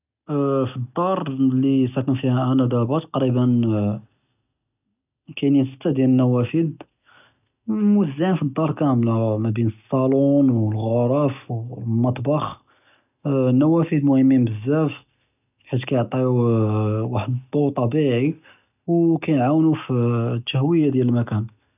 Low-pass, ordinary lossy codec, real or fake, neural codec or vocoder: 3.6 kHz; none; real; none